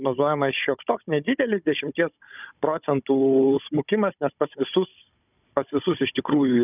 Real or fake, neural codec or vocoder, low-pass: fake; codec, 16 kHz, 16 kbps, FunCodec, trained on Chinese and English, 50 frames a second; 3.6 kHz